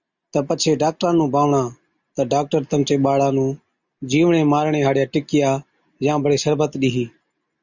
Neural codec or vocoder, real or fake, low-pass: none; real; 7.2 kHz